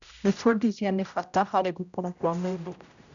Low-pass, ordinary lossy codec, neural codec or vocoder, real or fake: 7.2 kHz; none; codec, 16 kHz, 0.5 kbps, X-Codec, HuBERT features, trained on general audio; fake